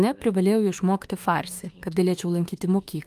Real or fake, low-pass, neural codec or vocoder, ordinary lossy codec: fake; 14.4 kHz; autoencoder, 48 kHz, 32 numbers a frame, DAC-VAE, trained on Japanese speech; Opus, 32 kbps